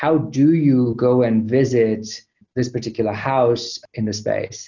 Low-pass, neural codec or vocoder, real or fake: 7.2 kHz; none; real